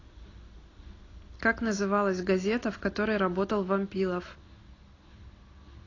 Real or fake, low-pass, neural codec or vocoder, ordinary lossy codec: real; 7.2 kHz; none; AAC, 32 kbps